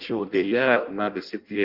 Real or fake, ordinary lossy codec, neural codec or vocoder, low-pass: fake; Opus, 32 kbps; codec, 16 kHz in and 24 kHz out, 0.6 kbps, FireRedTTS-2 codec; 5.4 kHz